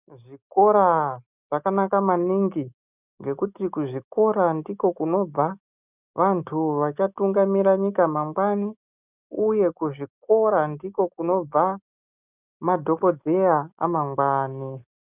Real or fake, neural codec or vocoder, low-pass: real; none; 3.6 kHz